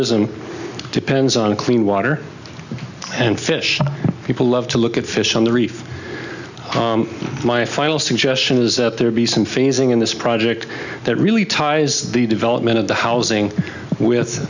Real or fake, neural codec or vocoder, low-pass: real; none; 7.2 kHz